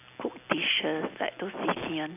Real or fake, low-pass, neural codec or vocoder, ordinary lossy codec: real; 3.6 kHz; none; none